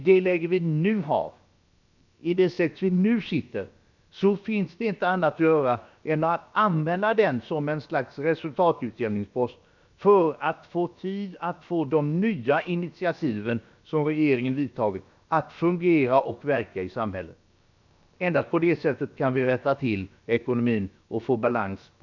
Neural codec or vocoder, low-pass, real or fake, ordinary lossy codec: codec, 16 kHz, about 1 kbps, DyCAST, with the encoder's durations; 7.2 kHz; fake; none